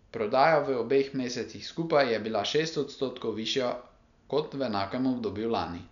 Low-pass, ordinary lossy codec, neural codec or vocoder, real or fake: 7.2 kHz; none; none; real